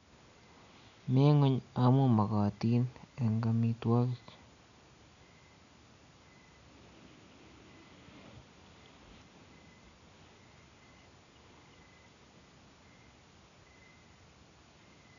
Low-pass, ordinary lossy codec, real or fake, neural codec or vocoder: 7.2 kHz; none; real; none